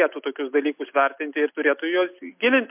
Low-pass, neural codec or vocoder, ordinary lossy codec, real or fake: 3.6 kHz; none; MP3, 32 kbps; real